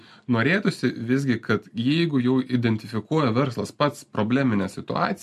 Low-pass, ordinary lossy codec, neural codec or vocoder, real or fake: 14.4 kHz; MP3, 64 kbps; none; real